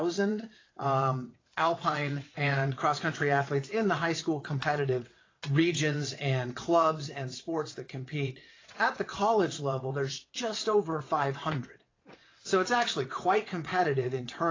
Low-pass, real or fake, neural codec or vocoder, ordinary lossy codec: 7.2 kHz; fake; vocoder, 22.05 kHz, 80 mel bands, WaveNeXt; AAC, 32 kbps